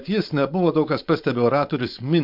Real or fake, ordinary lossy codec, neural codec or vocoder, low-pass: fake; MP3, 48 kbps; codec, 16 kHz, 4.8 kbps, FACodec; 5.4 kHz